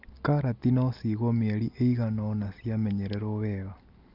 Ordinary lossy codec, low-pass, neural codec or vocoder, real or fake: Opus, 24 kbps; 5.4 kHz; none; real